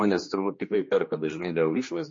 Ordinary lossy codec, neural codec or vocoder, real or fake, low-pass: MP3, 32 kbps; codec, 16 kHz, 2 kbps, X-Codec, HuBERT features, trained on general audio; fake; 7.2 kHz